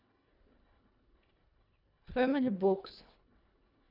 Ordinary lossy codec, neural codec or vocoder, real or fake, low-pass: none; codec, 24 kHz, 1.5 kbps, HILCodec; fake; 5.4 kHz